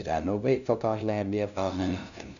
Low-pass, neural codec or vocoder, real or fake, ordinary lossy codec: 7.2 kHz; codec, 16 kHz, 0.5 kbps, FunCodec, trained on LibriTTS, 25 frames a second; fake; none